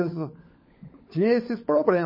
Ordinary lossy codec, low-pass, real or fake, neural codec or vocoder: MP3, 24 kbps; 5.4 kHz; fake; codec, 16 kHz, 16 kbps, FunCodec, trained on LibriTTS, 50 frames a second